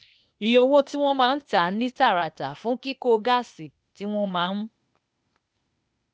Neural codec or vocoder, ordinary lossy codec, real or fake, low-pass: codec, 16 kHz, 0.8 kbps, ZipCodec; none; fake; none